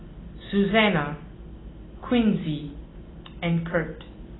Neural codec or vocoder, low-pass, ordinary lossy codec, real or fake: none; 7.2 kHz; AAC, 16 kbps; real